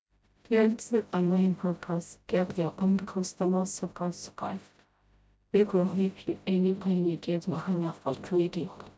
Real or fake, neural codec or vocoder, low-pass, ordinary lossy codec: fake; codec, 16 kHz, 0.5 kbps, FreqCodec, smaller model; none; none